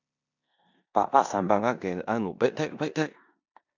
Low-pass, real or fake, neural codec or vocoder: 7.2 kHz; fake; codec, 16 kHz in and 24 kHz out, 0.9 kbps, LongCat-Audio-Codec, four codebook decoder